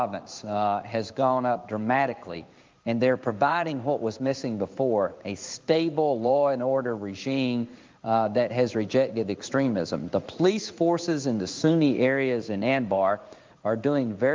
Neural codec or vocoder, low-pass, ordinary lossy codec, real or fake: codec, 16 kHz in and 24 kHz out, 1 kbps, XY-Tokenizer; 7.2 kHz; Opus, 32 kbps; fake